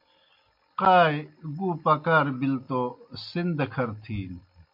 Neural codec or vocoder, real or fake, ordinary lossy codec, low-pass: none; real; MP3, 48 kbps; 5.4 kHz